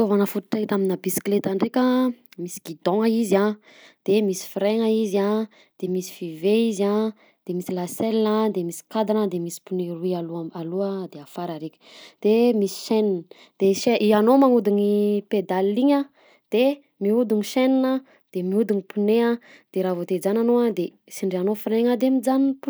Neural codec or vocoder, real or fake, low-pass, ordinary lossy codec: none; real; none; none